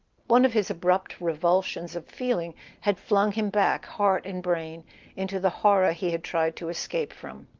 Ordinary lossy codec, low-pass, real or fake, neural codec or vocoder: Opus, 32 kbps; 7.2 kHz; real; none